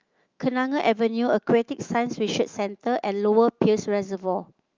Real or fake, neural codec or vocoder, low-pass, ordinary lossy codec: fake; autoencoder, 48 kHz, 128 numbers a frame, DAC-VAE, trained on Japanese speech; 7.2 kHz; Opus, 24 kbps